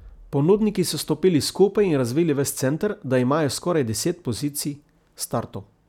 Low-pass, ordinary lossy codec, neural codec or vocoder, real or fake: 19.8 kHz; none; none; real